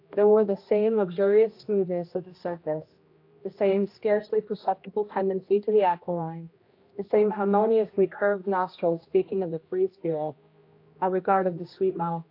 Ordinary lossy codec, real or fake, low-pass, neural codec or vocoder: AAC, 32 kbps; fake; 5.4 kHz; codec, 16 kHz, 1 kbps, X-Codec, HuBERT features, trained on general audio